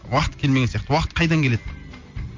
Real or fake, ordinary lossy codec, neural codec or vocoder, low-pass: real; MP3, 48 kbps; none; 7.2 kHz